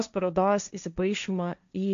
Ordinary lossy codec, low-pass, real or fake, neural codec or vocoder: MP3, 64 kbps; 7.2 kHz; fake; codec, 16 kHz, 1.1 kbps, Voila-Tokenizer